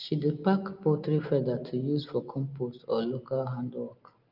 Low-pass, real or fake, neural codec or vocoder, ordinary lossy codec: 5.4 kHz; real; none; Opus, 24 kbps